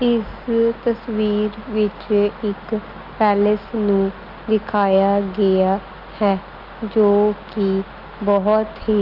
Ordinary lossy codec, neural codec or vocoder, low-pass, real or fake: Opus, 32 kbps; none; 5.4 kHz; real